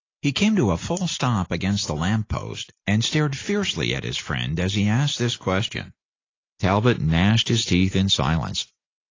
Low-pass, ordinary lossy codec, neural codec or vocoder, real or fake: 7.2 kHz; AAC, 32 kbps; none; real